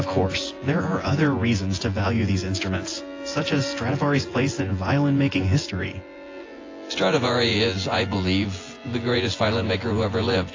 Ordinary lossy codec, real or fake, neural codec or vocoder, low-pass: AAC, 32 kbps; fake; vocoder, 24 kHz, 100 mel bands, Vocos; 7.2 kHz